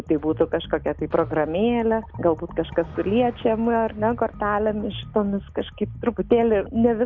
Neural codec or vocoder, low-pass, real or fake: none; 7.2 kHz; real